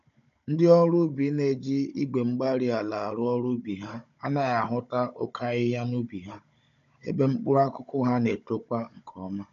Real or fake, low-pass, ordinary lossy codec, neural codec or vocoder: fake; 7.2 kHz; MP3, 64 kbps; codec, 16 kHz, 16 kbps, FunCodec, trained on Chinese and English, 50 frames a second